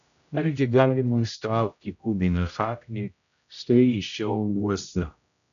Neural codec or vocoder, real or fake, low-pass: codec, 16 kHz, 0.5 kbps, X-Codec, HuBERT features, trained on general audio; fake; 7.2 kHz